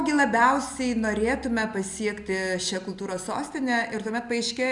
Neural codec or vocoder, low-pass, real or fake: none; 10.8 kHz; real